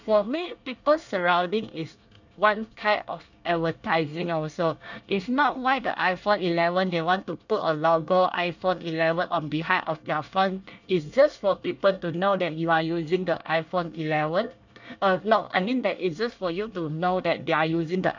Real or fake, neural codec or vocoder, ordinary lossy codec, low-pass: fake; codec, 24 kHz, 1 kbps, SNAC; none; 7.2 kHz